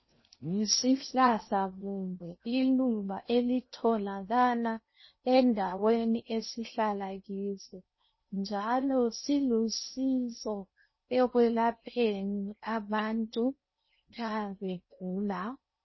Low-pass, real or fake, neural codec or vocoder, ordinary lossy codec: 7.2 kHz; fake; codec, 16 kHz in and 24 kHz out, 0.6 kbps, FocalCodec, streaming, 4096 codes; MP3, 24 kbps